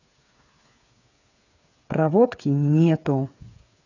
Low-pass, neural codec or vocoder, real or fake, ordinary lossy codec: 7.2 kHz; codec, 16 kHz, 8 kbps, FreqCodec, smaller model; fake; none